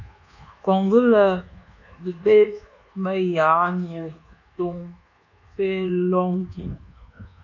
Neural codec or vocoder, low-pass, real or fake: codec, 24 kHz, 1.2 kbps, DualCodec; 7.2 kHz; fake